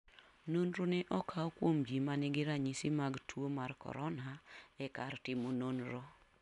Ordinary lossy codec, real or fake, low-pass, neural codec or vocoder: MP3, 96 kbps; real; 10.8 kHz; none